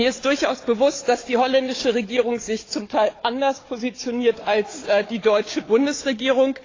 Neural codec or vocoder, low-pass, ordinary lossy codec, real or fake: codec, 16 kHz, 4 kbps, FunCodec, trained on Chinese and English, 50 frames a second; 7.2 kHz; AAC, 32 kbps; fake